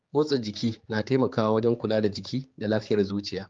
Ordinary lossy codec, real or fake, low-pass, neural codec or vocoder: Opus, 32 kbps; fake; 7.2 kHz; codec, 16 kHz, 4 kbps, X-Codec, HuBERT features, trained on general audio